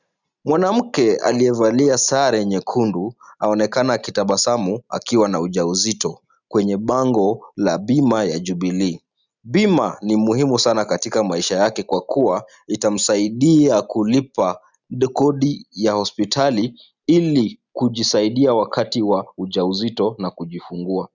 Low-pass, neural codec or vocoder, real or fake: 7.2 kHz; none; real